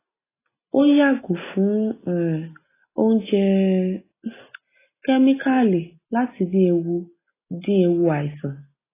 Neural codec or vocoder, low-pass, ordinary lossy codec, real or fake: none; 3.6 kHz; AAC, 16 kbps; real